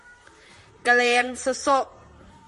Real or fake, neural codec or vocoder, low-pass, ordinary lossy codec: fake; vocoder, 44.1 kHz, 128 mel bands, Pupu-Vocoder; 14.4 kHz; MP3, 48 kbps